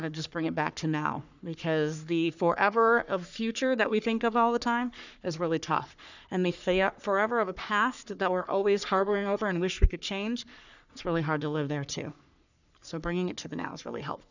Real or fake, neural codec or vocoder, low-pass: fake; codec, 44.1 kHz, 3.4 kbps, Pupu-Codec; 7.2 kHz